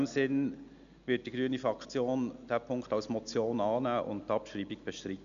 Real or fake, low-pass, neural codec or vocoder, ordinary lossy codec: real; 7.2 kHz; none; MP3, 96 kbps